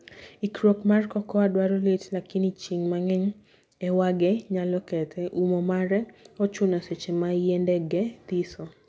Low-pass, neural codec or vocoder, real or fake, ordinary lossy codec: none; none; real; none